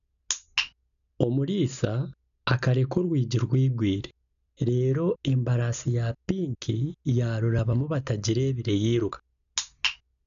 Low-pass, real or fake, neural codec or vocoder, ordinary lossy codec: 7.2 kHz; real; none; none